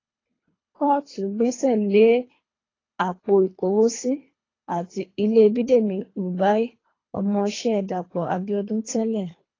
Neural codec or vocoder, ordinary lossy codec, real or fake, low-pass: codec, 24 kHz, 3 kbps, HILCodec; AAC, 32 kbps; fake; 7.2 kHz